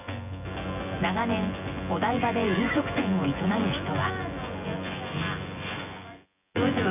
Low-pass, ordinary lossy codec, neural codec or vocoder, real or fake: 3.6 kHz; none; vocoder, 24 kHz, 100 mel bands, Vocos; fake